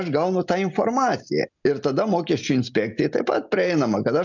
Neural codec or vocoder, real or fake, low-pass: none; real; 7.2 kHz